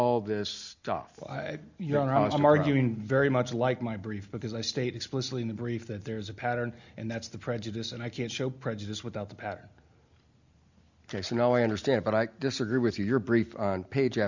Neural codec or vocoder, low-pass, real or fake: none; 7.2 kHz; real